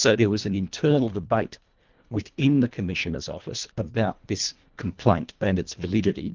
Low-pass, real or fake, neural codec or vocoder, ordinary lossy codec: 7.2 kHz; fake; codec, 24 kHz, 1.5 kbps, HILCodec; Opus, 32 kbps